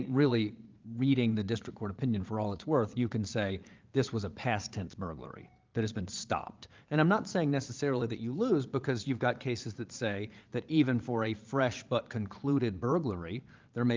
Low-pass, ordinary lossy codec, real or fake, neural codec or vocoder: 7.2 kHz; Opus, 32 kbps; fake; vocoder, 22.05 kHz, 80 mel bands, Vocos